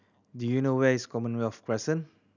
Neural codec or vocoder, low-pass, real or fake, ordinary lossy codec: none; 7.2 kHz; real; none